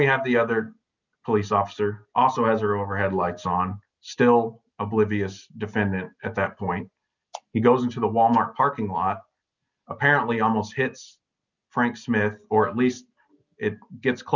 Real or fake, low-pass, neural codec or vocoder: real; 7.2 kHz; none